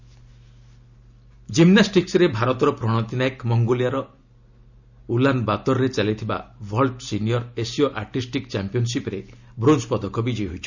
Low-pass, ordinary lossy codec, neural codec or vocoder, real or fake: 7.2 kHz; none; none; real